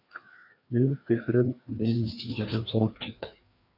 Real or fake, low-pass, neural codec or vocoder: fake; 5.4 kHz; codec, 16 kHz, 2 kbps, FreqCodec, larger model